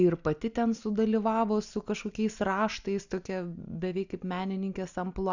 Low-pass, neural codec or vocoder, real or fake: 7.2 kHz; none; real